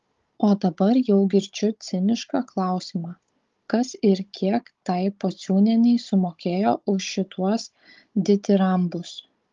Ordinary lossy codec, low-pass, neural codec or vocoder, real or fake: Opus, 32 kbps; 7.2 kHz; codec, 16 kHz, 16 kbps, FunCodec, trained on Chinese and English, 50 frames a second; fake